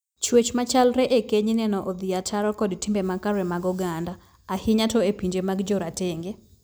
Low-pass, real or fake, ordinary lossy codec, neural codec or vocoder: none; real; none; none